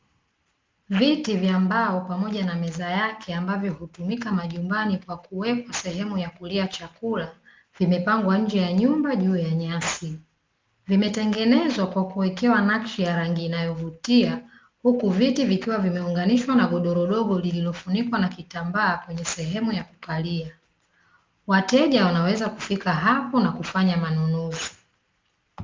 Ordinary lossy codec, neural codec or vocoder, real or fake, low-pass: Opus, 32 kbps; none; real; 7.2 kHz